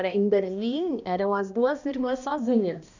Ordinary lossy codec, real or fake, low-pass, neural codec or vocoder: none; fake; 7.2 kHz; codec, 16 kHz, 1 kbps, X-Codec, HuBERT features, trained on balanced general audio